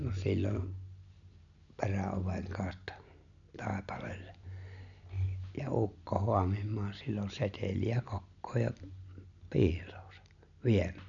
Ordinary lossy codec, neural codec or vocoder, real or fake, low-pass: none; none; real; 7.2 kHz